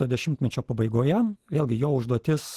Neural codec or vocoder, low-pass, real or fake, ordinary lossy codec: none; 14.4 kHz; real; Opus, 16 kbps